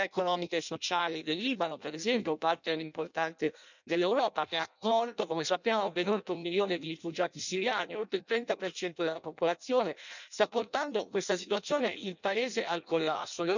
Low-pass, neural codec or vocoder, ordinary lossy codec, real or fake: 7.2 kHz; codec, 16 kHz in and 24 kHz out, 0.6 kbps, FireRedTTS-2 codec; none; fake